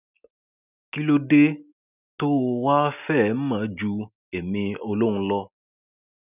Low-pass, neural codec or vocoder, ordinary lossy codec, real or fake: 3.6 kHz; none; none; real